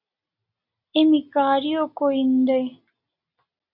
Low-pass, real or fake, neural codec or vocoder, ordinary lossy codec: 5.4 kHz; real; none; Opus, 64 kbps